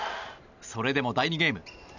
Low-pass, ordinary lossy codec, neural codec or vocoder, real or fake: 7.2 kHz; none; none; real